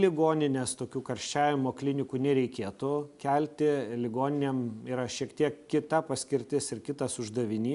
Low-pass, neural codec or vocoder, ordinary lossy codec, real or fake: 10.8 kHz; none; MP3, 96 kbps; real